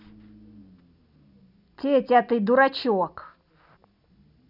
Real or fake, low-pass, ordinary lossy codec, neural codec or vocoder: real; 5.4 kHz; none; none